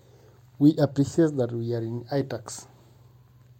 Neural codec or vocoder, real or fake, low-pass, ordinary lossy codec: none; real; 19.8 kHz; MP3, 64 kbps